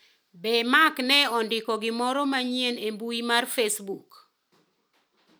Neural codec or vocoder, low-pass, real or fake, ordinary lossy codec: none; none; real; none